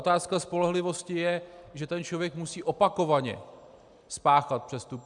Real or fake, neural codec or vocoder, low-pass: real; none; 10.8 kHz